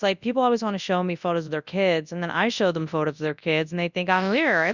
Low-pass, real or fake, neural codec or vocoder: 7.2 kHz; fake; codec, 24 kHz, 0.9 kbps, WavTokenizer, large speech release